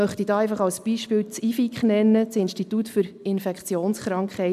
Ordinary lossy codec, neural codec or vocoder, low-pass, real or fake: MP3, 96 kbps; none; 14.4 kHz; real